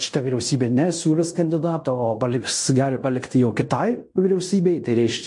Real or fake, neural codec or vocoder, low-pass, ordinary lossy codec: fake; codec, 16 kHz in and 24 kHz out, 0.9 kbps, LongCat-Audio-Codec, fine tuned four codebook decoder; 10.8 kHz; MP3, 48 kbps